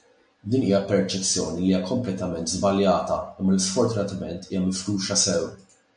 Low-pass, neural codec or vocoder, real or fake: 9.9 kHz; none; real